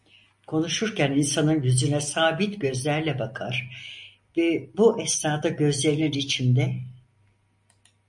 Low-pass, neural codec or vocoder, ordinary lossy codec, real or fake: 10.8 kHz; none; MP3, 48 kbps; real